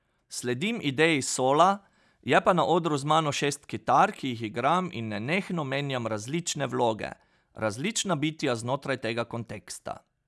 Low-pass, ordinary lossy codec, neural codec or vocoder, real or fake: none; none; none; real